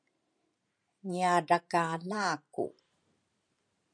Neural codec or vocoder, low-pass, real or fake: vocoder, 44.1 kHz, 128 mel bands every 512 samples, BigVGAN v2; 9.9 kHz; fake